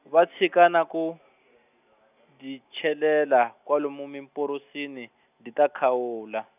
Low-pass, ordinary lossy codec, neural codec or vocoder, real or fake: 3.6 kHz; none; none; real